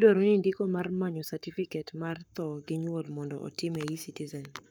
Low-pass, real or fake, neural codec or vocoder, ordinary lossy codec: none; fake; codec, 44.1 kHz, 7.8 kbps, Pupu-Codec; none